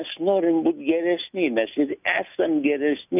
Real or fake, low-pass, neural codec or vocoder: real; 3.6 kHz; none